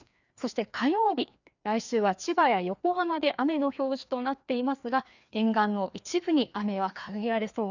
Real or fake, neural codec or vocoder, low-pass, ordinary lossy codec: fake; codec, 16 kHz, 2 kbps, FreqCodec, larger model; 7.2 kHz; none